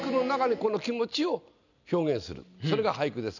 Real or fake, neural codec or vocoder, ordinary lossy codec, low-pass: real; none; none; 7.2 kHz